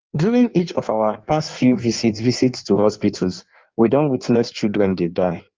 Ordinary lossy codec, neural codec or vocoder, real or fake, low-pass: Opus, 32 kbps; codec, 16 kHz in and 24 kHz out, 1.1 kbps, FireRedTTS-2 codec; fake; 7.2 kHz